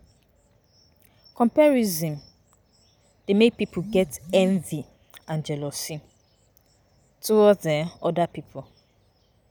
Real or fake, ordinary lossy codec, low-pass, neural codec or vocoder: real; none; none; none